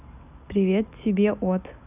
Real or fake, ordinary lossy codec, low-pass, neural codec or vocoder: real; none; 3.6 kHz; none